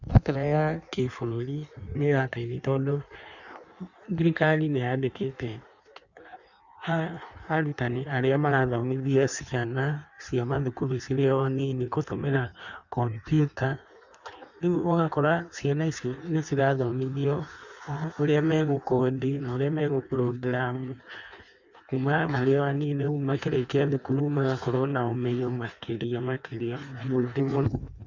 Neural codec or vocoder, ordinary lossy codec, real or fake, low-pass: codec, 16 kHz in and 24 kHz out, 1.1 kbps, FireRedTTS-2 codec; none; fake; 7.2 kHz